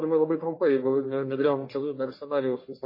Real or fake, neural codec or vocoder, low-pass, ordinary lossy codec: fake; codec, 44.1 kHz, 1.7 kbps, Pupu-Codec; 5.4 kHz; MP3, 24 kbps